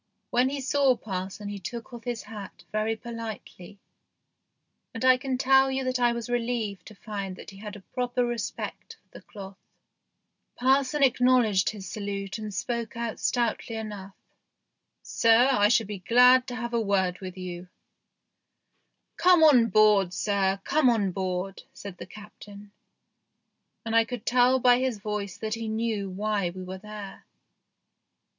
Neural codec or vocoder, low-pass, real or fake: none; 7.2 kHz; real